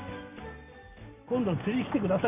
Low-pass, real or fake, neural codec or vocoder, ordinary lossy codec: 3.6 kHz; real; none; none